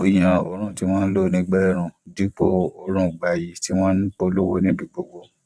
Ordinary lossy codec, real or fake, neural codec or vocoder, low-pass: none; fake; vocoder, 22.05 kHz, 80 mel bands, WaveNeXt; none